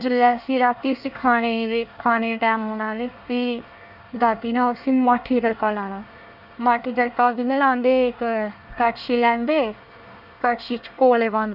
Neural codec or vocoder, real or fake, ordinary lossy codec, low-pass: codec, 24 kHz, 1 kbps, SNAC; fake; none; 5.4 kHz